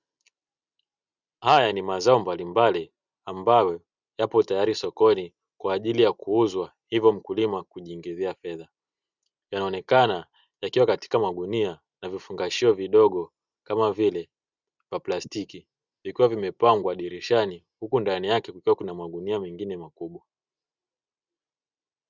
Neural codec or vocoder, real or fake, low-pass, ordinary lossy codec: none; real; 7.2 kHz; Opus, 64 kbps